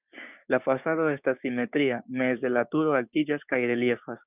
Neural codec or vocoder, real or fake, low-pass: codec, 16 kHz, 6 kbps, DAC; fake; 3.6 kHz